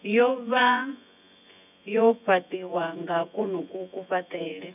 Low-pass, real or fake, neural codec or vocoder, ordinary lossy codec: 3.6 kHz; fake; vocoder, 24 kHz, 100 mel bands, Vocos; none